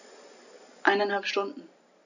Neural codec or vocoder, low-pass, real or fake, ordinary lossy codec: none; 7.2 kHz; real; none